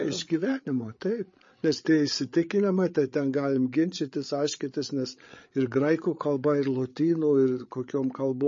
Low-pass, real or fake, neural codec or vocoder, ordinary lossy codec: 7.2 kHz; fake; codec, 16 kHz, 8 kbps, FreqCodec, larger model; MP3, 32 kbps